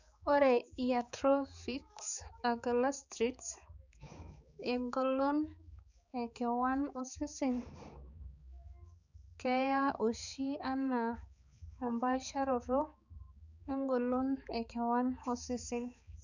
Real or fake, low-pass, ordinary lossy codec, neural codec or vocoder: fake; 7.2 kHz; none; codec, 16 kHz, 4 kbps, X-Codec, HuBERT features, trained on balanced general audio